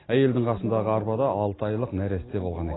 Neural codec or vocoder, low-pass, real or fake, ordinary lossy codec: none; 7.2 kHz; real; AAC, 16 kbps